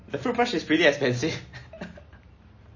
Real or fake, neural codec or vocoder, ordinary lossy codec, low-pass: real; none; MP3, 32 kbps; 7.2 kHz